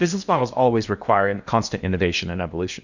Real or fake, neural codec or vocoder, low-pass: fake; codec, 16 kHz in and 24 kHz out, 0.8 kbps, FocalCodec, streaming, 65536 codes; 7.2 kHz